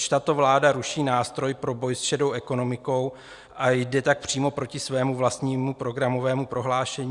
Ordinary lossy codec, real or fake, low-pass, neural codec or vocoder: Opus, 64 kbps; real; 10.8 kHz; none